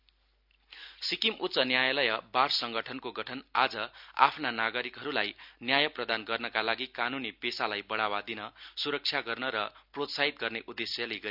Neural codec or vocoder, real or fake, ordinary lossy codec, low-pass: none; real; none; 5.4 kHz